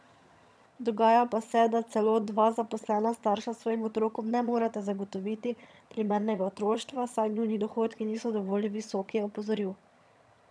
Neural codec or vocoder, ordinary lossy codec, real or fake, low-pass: vocoder, 22.05 kHz, 80 mel bands, HiFi-GAN; none; fake; none